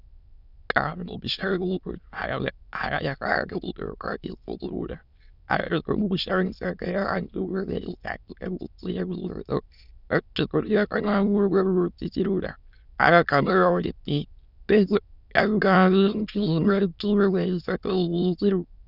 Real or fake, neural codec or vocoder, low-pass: fake; autoencoder, 22.05 kHz, a latent of 192 numbers a frame, VITS, trained on many speakers; 5.4 kHz